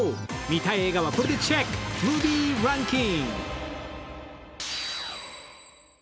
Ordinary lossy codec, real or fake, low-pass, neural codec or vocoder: none; real; none; none